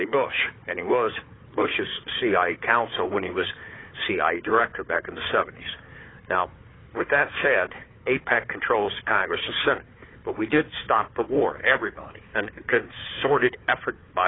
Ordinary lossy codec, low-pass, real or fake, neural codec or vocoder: AAC, 16 kbps; 7.2 kHz; fake; codec, 16 kHz, 4 kbps, FunCodec, trained on Chinese and English, 50 frames a second